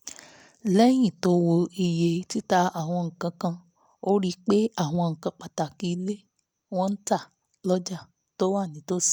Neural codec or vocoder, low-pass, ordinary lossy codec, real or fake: none; none; none; real